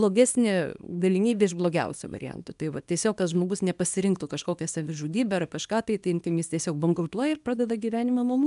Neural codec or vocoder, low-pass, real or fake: codec, 24 kHz, 0.9 kbps, WavTokenizer, small release; 10.8 kHz; fake